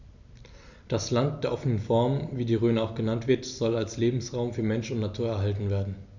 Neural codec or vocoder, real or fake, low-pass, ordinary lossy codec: none; real; 7.2 kHz; none